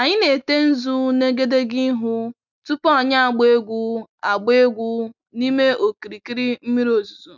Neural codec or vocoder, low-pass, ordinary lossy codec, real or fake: none; 7.2 kHz; none; real